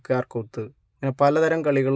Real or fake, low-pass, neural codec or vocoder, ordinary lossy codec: real; none; none; none